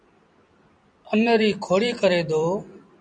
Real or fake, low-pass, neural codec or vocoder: fake; 9.9 kHz; vocoder, 44.1 kHz, 128 mel bands every 256 samples, BigVGAN v2